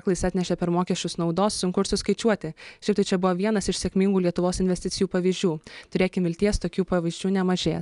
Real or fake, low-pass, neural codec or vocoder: real; 10.8 kHz; none